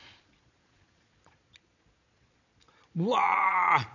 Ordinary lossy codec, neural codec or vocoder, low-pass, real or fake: none; vocoder, 22.05 kHz, 80 mel bands, Vocos; 7.2 kHz; fake